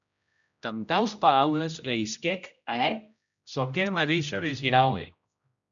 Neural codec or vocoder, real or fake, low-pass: codec, 16 kHz, 0.5 kbps, X-Codec, HuBERT features, trained on general audio; fake; 7.2 kHz